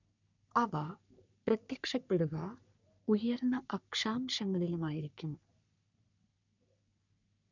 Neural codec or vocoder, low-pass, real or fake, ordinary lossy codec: codec, 44.1 kHz, 3.4 kbps, Pupu-Codec; 7.2 kHz; fake; none